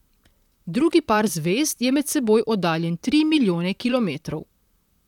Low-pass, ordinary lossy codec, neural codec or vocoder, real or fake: 19.8 kHz; none; vocoder, 44.1 kHz, 128 mel bands, Pupu-Vocoder; fake